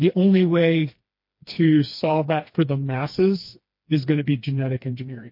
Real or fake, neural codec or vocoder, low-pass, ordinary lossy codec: fake; codec, 16 kHz, 2 kbps, FreqCodec, smaller model; 5.4 kHz; MP3, 32 kbps